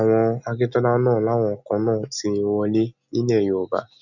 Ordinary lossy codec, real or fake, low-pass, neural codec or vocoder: none; real; 7.2 kHz; none